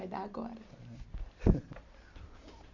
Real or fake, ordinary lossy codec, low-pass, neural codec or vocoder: real; Opus, 64 kbps; 7.2 kHz; none